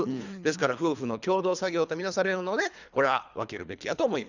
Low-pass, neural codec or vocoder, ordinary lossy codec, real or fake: 7.2 kHz; codec, 24 kHz, 3 kbps, HILCodec; none; fake